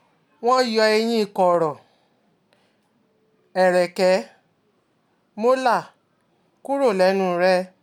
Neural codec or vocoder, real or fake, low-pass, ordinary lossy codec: none; real; 19.8 kHz; none